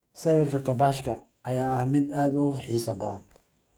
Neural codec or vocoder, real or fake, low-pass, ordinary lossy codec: codec, 44.1 kHz, 2.6 kbps, DAC; fake; none; none